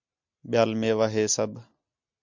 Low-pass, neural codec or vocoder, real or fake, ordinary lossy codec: 7.2 kHz; none; real; MP3, 64 kbps